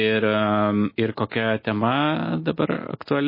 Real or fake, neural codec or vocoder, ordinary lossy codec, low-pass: real; none; MP3, 24 kbps; 5.4 kHz